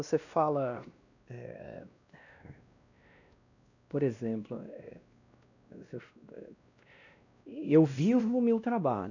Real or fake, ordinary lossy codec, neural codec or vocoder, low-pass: fake; none; codec, 16 kHz, 1 kbps, X-Codec, WavLM features, trained on Multilingual LibriSpeech; 7.2 kHz